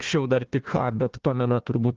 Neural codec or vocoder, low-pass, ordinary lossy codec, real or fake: codec, 16 kHz, 1 kbps, FunCodec, trained on Chinese and English, 50 frames a second; 7.2 kHz; Opus, 16 kbps; fake